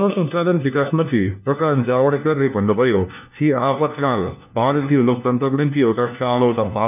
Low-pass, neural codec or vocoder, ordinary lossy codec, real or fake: 3.6 kHz; codec, 16 kHz, 1 kbps, FunCodec, trained on LibriTTS, 50 frames a second; none; fake